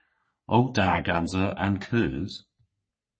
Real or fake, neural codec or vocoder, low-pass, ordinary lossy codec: fake; codec, 44.1 kHz, 3.4 kbps, Pupu-Codec; 9.9 kHz; MP3, 32 kbps